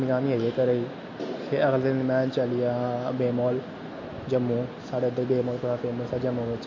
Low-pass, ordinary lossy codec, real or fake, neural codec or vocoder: 7.2 kHz; MP3, 32 kbps; real; none